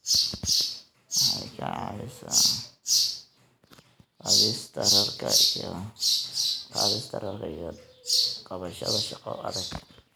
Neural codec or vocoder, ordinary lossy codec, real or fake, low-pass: none; none; real; none